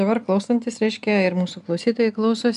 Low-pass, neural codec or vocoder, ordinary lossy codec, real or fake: 10.8 kHz; none; AAC, 96 kbps; real